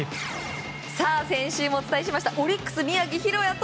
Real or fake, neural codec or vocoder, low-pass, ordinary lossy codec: real; none; none; none